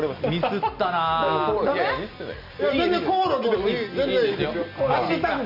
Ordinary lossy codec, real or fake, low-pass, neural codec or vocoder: none; real; 5.4 kHz; none